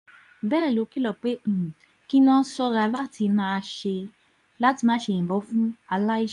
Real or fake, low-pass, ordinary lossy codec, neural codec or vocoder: fake; 10.8 kHz; none; codec, 24 kHz, 0.9 kbps, WavTokenizer, medium speech release version 2